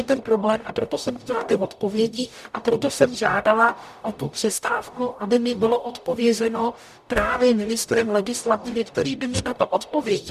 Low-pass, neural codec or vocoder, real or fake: 14.4 kHz; codec, 44.1 kHz, 0.9 kbps, DAC; fake